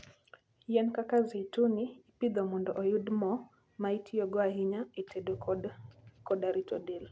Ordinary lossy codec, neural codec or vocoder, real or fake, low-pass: none; none; real; none